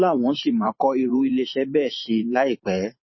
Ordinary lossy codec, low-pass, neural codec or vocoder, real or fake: MP3, 24 kbps; 7.2 kHz; vocoder, 44.1 kHz, 128 mel bands every 512 samples, BigVGAN v2; fake